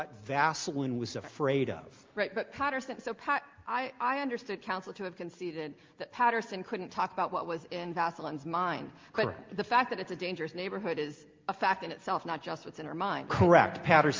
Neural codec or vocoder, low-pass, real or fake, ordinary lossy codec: none; 7.2 kHz; real; Opus, 32 kbps